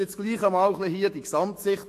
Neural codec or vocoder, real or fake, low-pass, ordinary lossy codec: autoencoder, 48 kHz, 128 numbers a frame, DAC-VAE, trained on Japanese speech; fake; 14.4 kHz; AAC, 64 kbps